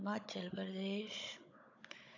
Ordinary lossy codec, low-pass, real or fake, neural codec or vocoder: AAC, 48 kbps; 7.2 kHz; fake; codec, 16 kHz, 16 kbps, FunCodec, trained on Chinese and English, 50 frames a second